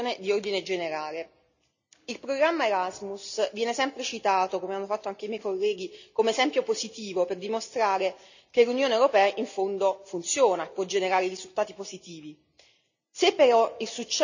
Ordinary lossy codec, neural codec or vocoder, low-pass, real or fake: none; none; 7.2 kHz; real